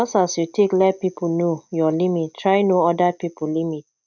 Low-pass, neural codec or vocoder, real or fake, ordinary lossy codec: 7.2 kHz; none; real; none